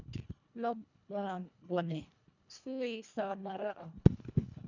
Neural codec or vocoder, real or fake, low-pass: codec, 24 kHz, 1.5 kbps, HILCodec; fake; 7.2 kHz